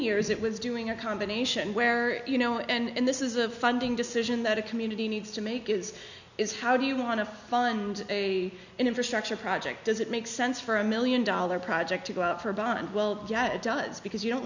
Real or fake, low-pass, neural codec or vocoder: real; 7.2 kHz; none